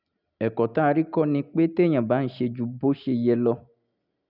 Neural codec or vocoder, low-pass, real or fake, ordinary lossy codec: none; 5.4 kHz; real; none